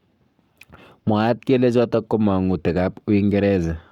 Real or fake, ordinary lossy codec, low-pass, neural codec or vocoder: fake; none; 19.8 kHz; codec, 44.1 kHz, 7.8 kbps, Pupu-Codec